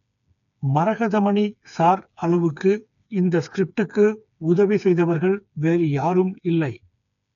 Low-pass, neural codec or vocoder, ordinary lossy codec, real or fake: 7.2 kHz; codec, 16 kHz, 4 kbps, FreqCodec, smaller model; none; fake